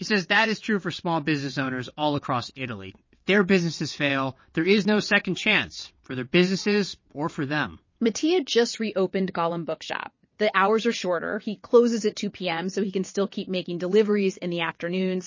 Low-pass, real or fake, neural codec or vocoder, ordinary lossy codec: 7.2 kHz; fake; vocoder, 22.05 kHz, 80 mel bands, WaveNeXt; MP3, 32 kbps